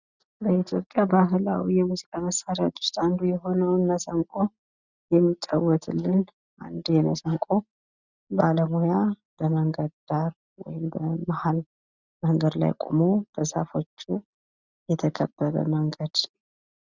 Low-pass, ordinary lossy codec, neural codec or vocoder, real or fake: 7.2 kHz; Opus, 64 kbps; none; real